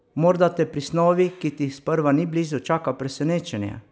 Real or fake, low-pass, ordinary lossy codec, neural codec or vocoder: real; none; none; none